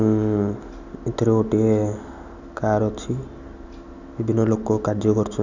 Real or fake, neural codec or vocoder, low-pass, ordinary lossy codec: real; none; 7.2 kHz; none